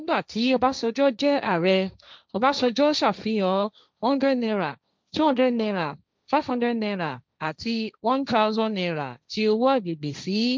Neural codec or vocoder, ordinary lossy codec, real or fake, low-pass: codec, 16 kHz, 1.1 kbps, Voila-Tokenizer; none; fake; none